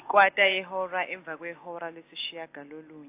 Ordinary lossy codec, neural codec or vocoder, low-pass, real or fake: AAC, 24 kbps; none; 3.6 kHz; real